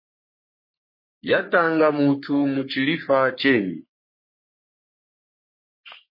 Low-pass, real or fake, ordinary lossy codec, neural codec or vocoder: 5.4 kHz; fake; MP3, 24 kbps; codec, 44.1 kHz, 3.4 kbps, Pupu-Codec